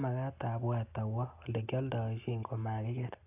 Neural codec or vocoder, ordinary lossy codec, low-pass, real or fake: none; AAC, 32 kbps; 3.6 kHz; real